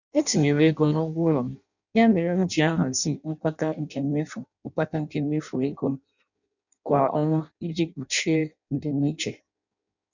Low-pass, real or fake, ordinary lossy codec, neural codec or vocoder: 7.2 kHz; fake; none; codec, 16 kHz in and 24 kHz out, 0.6 kbps, FireRedTTS-2 codec